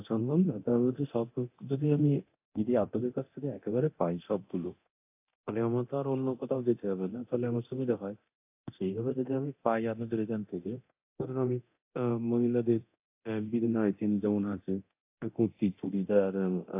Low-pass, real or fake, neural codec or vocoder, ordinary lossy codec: 3.6 kHz; fake; codec, 24 kHz, 0.9 kbps, DualCodec; AAC, 32 kbps